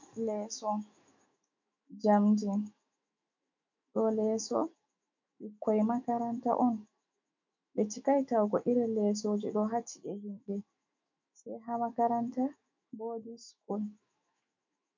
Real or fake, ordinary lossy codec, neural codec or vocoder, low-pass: fake; MP3, 48 kbps; autoencoder, 48 kHz, 128 numbers a frame, DAC-VAE, trained on Japanese speech; 7.2 kHz